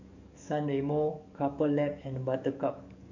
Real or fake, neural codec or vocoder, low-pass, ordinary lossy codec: fake; codec, 44.1 kHz, 7.8 kbps, DAC; 7.2 kHz; none